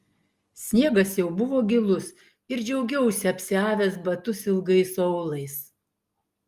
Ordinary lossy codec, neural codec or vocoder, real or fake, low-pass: Opus, 32 kbps; none; real; 14.4 kHz